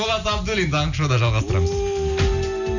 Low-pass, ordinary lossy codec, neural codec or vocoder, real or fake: 7.2 kHz; none; none; real